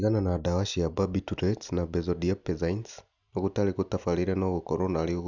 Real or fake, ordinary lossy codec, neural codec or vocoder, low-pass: real; none; none; 7.2 kHz